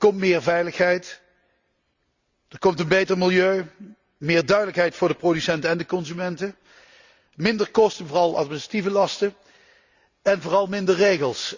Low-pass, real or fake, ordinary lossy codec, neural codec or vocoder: 7.2 kHz; real; Opus, 64 kbps; none